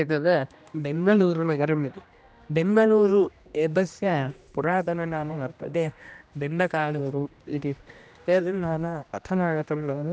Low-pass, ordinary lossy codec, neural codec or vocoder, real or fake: none; none; codec, 16 kHz, 1 kbps, X-Codec, HuBERT features, trained on general audio; fake